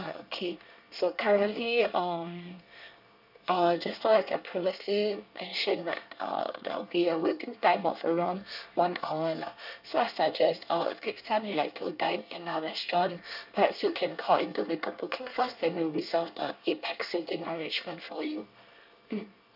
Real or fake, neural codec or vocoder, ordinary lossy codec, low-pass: fake; codec, 24 kHz, 1 kbps, SNAC; none; 5.4 kHz